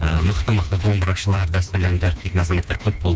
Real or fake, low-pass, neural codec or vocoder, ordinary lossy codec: fake; none; codec, 16 kHz, 2 kbps, FreqCodec, smaller model; none